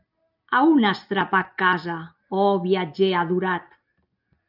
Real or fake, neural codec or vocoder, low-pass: real; none; 5.4 kHz